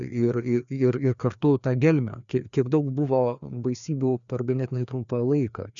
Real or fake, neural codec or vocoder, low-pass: fake; codec, 16 kHz, 2 kbps, FreqCodec, larger model; 7.2 kHz